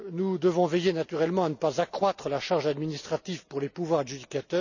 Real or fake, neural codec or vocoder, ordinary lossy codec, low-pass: real; none; none; 7.2 kHz